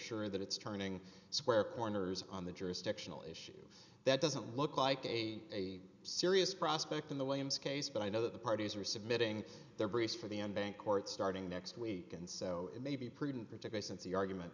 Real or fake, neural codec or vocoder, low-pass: real; none; 7.2 kHz